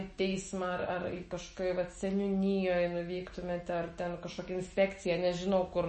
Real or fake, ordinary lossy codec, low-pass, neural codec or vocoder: fake; MP3, 32 kbps; 10.8 kHz; autoencoder, 48 kHz, 128 numbers a frame, DAC-VAE, trained on Japanese speech